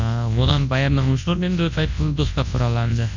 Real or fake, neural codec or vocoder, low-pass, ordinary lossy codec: fake; codec, 24 kHz, 0.9 kbps, WavTokenizer, large speech release; 7.2 kHz; MP3, 64 kbps